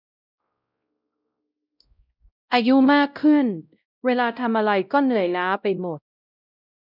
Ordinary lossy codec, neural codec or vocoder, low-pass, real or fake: none; codec, 16 kHz, 0.5 kbps, X-Codec, WavLM features, trained on Multilingual LibriSpeech; 5.4 kHz; fake